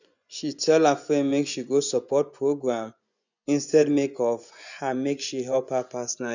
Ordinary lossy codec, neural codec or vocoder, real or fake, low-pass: none; vocoder, 24 kHz, 100 mel bands, Vocos; fake; 7.2 kHz